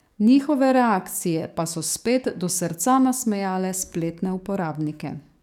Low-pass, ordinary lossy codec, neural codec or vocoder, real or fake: 19.8 kHz; none; codec, 44.1 kHz, 7.8 kbps, DAC; fake